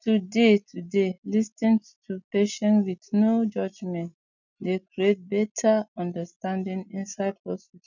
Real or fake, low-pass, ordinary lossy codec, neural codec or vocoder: real; 7.2 kHz; none; none